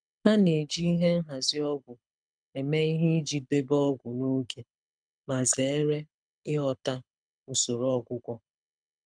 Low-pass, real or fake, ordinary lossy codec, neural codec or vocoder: 9.9 kHz; fake; none; codec, 24 kHz, 6 kbps, HILCodec